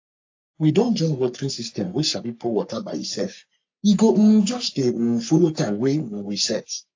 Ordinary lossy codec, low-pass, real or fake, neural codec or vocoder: AAC, 48 kbps; 7.2 kHz; fake; codec, 44.1 kHz, 3.4 kbps, Pupu-Codec